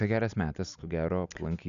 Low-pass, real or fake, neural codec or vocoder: 7.2 kHz; real; none